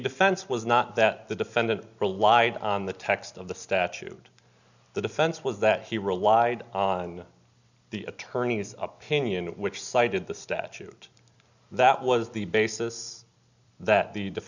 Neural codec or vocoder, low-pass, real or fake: none; 7.2 kHz; real